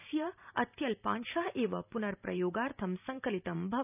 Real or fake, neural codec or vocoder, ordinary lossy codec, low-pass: fake; vocoder, 44.1 kHz, 128 mel bands every 512 samples, BigVGAN v2; none; 3.6 kHz